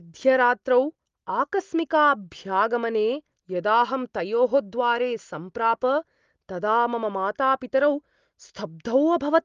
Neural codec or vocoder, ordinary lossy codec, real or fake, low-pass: none; Opus, 32 kbps; real; 7.2 kHz